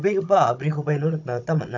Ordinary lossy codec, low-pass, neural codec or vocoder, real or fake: none; 7.2 kHz; codec, 16 kHz, 16 kbps, FunCodec, trained on Chinese and English, 50 frames a second; fake